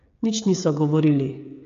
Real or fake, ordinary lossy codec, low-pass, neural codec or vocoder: fake; MP3, 48 kbps; 7.2 kHz; codec, 16 kHz, 16 kbps, FreqCodec, smaller model